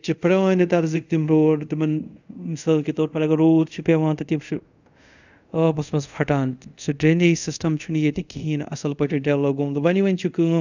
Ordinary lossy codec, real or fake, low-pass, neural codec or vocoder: none; fake; 7.2 kHz; codec, 24 kHz, 0.5 kbps, DualCodec